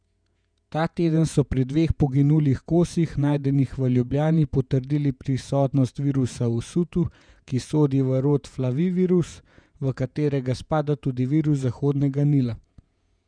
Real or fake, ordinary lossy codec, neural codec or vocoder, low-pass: fake; none; vocoder, 48 kHz, 128 mel bands, Vocos; 9.9 kHz